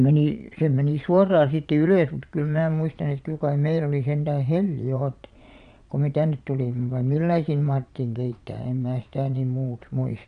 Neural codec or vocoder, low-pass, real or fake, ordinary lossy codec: vocoder, 22.05 kHz, 80 mel bands, Vocos; 9.9 kHz; fake; none